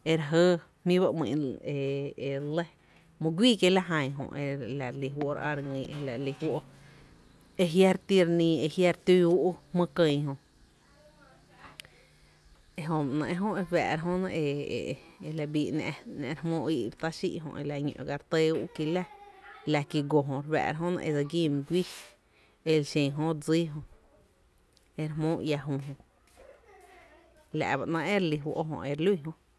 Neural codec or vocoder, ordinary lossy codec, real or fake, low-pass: none; none; real; none